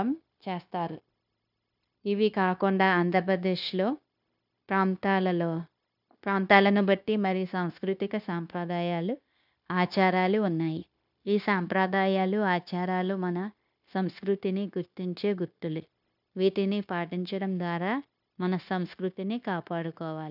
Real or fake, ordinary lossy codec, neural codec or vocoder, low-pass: fake; none; codec, 16 kHz, 0.9 kbps, LongCat-Audio-Codec; 5.4 kHz